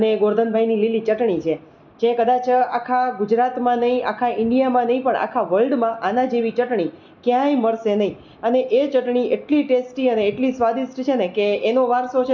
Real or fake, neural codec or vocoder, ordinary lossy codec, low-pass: real; none; none; 7.2 kHz